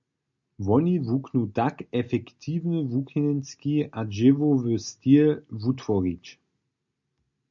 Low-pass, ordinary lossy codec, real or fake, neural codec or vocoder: 7.2 kHz; MP3, 64 kbps; real; none